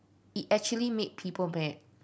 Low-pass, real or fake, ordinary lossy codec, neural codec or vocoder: none; real; none; none